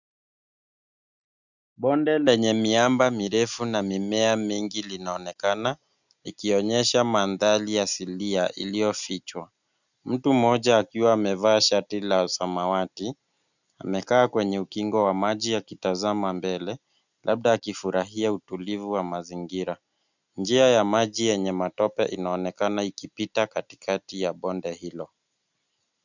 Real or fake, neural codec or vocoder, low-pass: real; none; 7.2 kHz